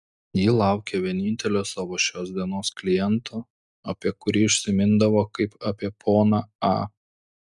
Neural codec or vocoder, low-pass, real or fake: none; 10.8 kHz; real